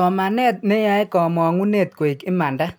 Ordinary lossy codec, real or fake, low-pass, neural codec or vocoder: none; real; none; none